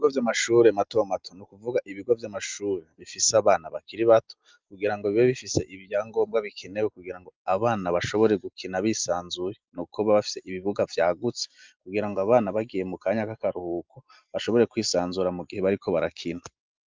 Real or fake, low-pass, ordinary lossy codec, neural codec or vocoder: real; 7.2 kHz; Opus, 24 kbps; none